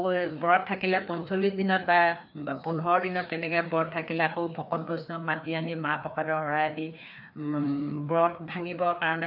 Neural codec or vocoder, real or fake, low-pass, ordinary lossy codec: codec, 16 kHz, 2 kbps, FreqCodec, larger model; fake; 5.4 kHz; AAC, 48 kbps